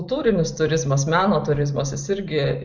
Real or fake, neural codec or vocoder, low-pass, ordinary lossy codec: real; none; 7.2 kHz; MP3, 64 kbps